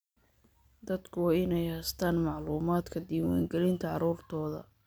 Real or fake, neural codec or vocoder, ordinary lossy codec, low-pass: real; none; none; none